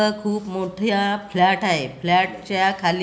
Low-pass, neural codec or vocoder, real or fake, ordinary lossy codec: none; none; real; none